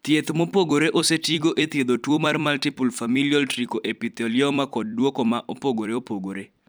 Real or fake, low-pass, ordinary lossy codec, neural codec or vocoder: fake; none; none; vocoder, 44.1 kHz, 128 mel bands every 256 samples, BigVGAN v2